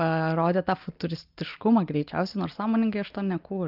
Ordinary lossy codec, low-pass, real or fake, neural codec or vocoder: Opus, 24 kbps; 5.4 kHz; real; none